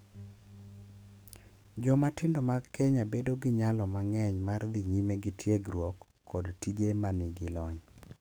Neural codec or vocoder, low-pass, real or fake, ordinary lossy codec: codec, 44.1 kHz, 7.8 kbps, DAC; none; fake; none